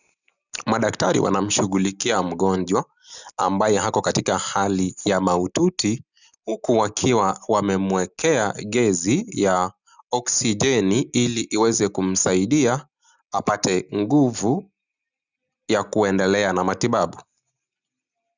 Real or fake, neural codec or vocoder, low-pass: real; none; 7.2 kHz